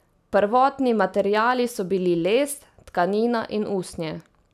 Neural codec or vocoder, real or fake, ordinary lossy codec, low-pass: none; real; none; 14.4 kHz